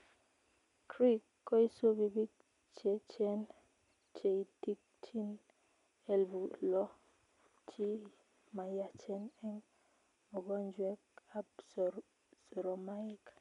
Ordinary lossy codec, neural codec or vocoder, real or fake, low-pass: none; none; real; 10.8 kHz